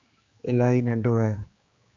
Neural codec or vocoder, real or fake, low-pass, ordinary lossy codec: codec, 16 kHz, 2 kbps, X-Codec, HuBERT features, trained on general audio; fake; 7.2 kHz; Opus, 64 kbps